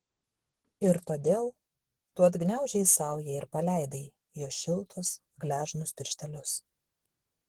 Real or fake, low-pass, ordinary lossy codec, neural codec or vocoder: fake; 19.8 kHz; Opus, 16 kbps; codec, 44.1 kHz, 7.8 kbps, DAC